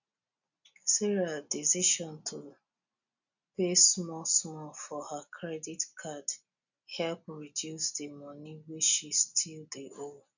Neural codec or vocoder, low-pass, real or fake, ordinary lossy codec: none; 7.2 kHz; real; none